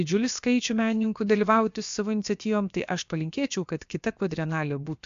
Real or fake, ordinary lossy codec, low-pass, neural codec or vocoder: fake; MP3, 64 kbps; 7.2 kHz; codec, 16 kHz, about 1 kbps, DyCAST, with the encoder's durations